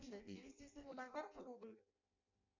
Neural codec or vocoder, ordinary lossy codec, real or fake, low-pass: codec, 16 kHz in and 24 kHz out, 0.6 kbps, FireRedTTS-2 codec; MP3, 64 kbps; fake; 7.2 kHz